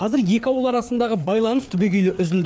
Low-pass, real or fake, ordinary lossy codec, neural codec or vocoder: none; fake; none; codec, 16 kHz, 4 kbps, FreqCodec, larger model